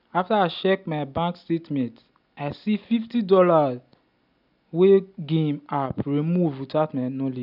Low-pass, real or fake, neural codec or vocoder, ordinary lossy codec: 5.4 kHz; real; none; none